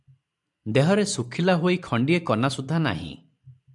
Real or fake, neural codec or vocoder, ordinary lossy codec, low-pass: fake; vocoder, 44.1 kHz, 128 mel bands every 512 samples, BigVGAN v2; MP3, 96 kbps; 10.8 kHz